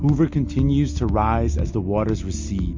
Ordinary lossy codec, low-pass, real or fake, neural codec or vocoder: MP3, 48 kbps; 7.2 kHz; real; none